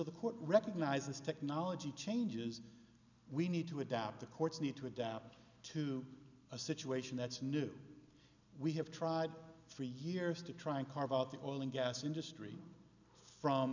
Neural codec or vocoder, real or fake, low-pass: none; real; 7.2 kHz